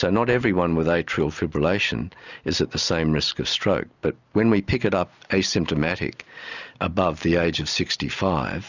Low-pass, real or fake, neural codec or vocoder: 7.2 kHz; real; none